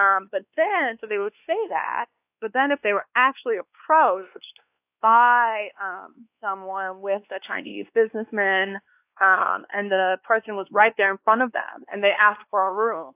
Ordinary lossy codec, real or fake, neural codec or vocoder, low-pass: AAC, 32 kbps; fake; codec, 16 kHz, 2 kbps, X-Codec, WavLM features, trained on Multilingual LibriSpeech; 3.6 kHz